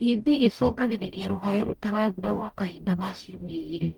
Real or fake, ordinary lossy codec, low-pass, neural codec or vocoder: fake; Opus, 32 kbps; 19.8 kHz; codec, 44.1 kHz, 0.9 kbps, DAC